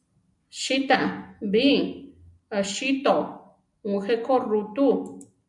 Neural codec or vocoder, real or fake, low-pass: none; real; 10.8 kHz